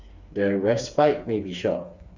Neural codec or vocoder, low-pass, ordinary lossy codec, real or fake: codec, 16 kHz, 4 kbps, FreqCodec, smaller model; 7.2 kHz; none; fake